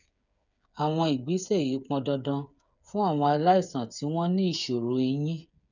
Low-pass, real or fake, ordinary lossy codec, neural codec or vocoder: 7.2 kHz; fake; none; codec, 16 kHz, 8 kbps, FreqCodec, smaller model